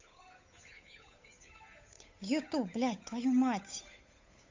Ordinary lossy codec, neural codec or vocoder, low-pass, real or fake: MP3, 48 kbps; codec, 16 kHz, 8 kbps, FunCodec, trained on Chinese and English, 25 frames a second; 7.2 kHz; fake